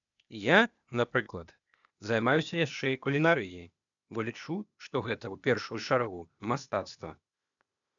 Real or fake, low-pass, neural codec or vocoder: fake; 7.2 kHz; codec, 16 kHz, 0.8 kbps, ZipCodec